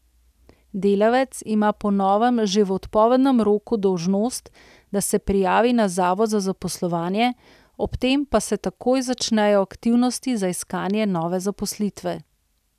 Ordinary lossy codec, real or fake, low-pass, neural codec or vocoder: none; real; 14.4 kHz; none